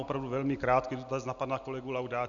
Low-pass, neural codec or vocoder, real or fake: 7.2 kHz; none; real